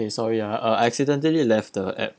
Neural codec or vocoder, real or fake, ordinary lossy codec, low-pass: none; real; none; none